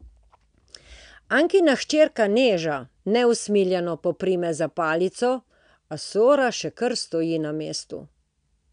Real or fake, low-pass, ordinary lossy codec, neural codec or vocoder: real; 9.9 kHz; none; none